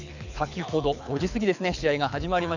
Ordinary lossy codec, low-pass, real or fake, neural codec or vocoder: none; 7.2 kHz; fake; codec, 24 kHz, 6 kbps, HILCodec